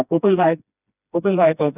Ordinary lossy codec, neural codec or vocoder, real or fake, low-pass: none; codec, 16 kHz, 1 kbps, FreqCodec, smaller model; fake; 3.6 kHz